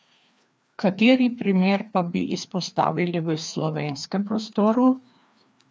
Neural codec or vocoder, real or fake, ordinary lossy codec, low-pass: codec, 16 kHz, 2 kbps, FreqCodec, larger model; fake; none; none